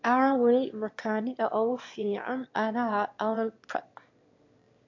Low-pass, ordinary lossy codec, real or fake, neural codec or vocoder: 7.2 kHz; MP3, 48 kbps; fake; autoencoder, 22.05 kHz, a latent of 192 numbers a frame, VITS, trained on one speaker